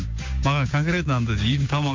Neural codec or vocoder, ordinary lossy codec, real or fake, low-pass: codec, 16 kHz, 6 kbps, DAC; MP3, 48 kbps; fake; 7.2 kHz